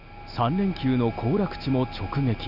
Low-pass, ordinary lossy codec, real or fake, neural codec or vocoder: 5.4 kHz; none; real; none